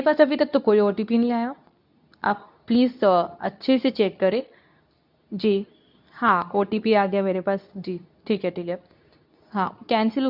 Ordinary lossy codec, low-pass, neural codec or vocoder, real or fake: none; 5.4 kHz; codec, 24 kHz, 0.9 kbps, WavTokenizer, medium speech release version 2; fake